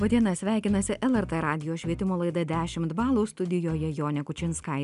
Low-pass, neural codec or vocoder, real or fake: 10.8 kHz; none; real